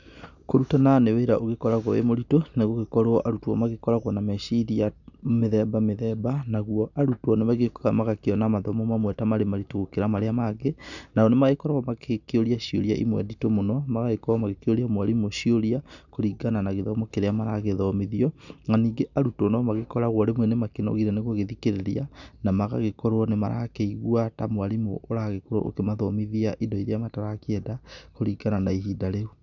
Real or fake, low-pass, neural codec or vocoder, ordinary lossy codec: real; 7.2 kHz; none; none